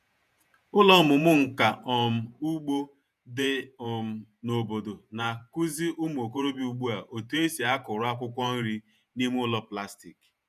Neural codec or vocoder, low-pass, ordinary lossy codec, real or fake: vocoder, 48 kHz, 128 mel bands, Vocos; 14.4 kHz; none; fake